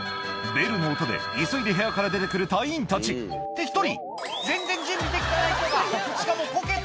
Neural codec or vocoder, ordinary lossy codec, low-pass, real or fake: none; none; none; real